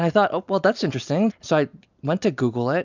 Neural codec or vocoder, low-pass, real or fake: none; 7.2 kHz; real